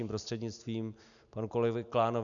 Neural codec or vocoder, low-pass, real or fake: none; 7.2 kHz; real